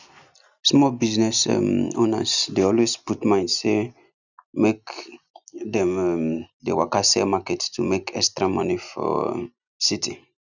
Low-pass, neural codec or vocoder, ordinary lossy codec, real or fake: 7.2 kHz; none; none; real